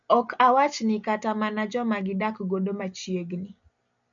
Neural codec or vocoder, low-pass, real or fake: none; 7.2 kHz; real